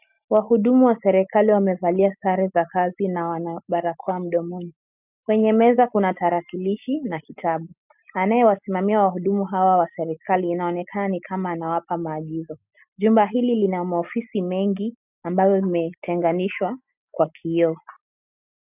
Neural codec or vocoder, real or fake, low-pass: none; real; 3.6 kHz